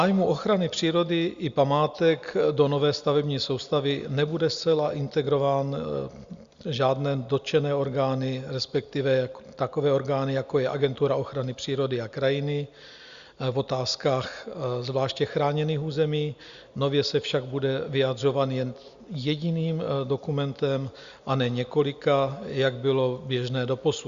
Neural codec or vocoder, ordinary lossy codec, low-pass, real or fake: none; Opus, 64 kbps; 7.2 kHz; real